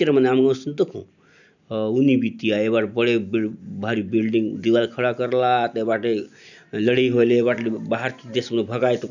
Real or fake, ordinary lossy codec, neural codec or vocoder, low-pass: real; none; none; 7.2 kHz